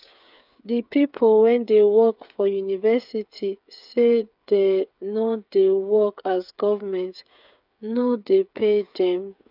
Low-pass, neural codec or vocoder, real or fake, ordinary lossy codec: 5.4 kHz; codec, 16 kHz, 8 kbps, FreqCodec, smaller model; fake; none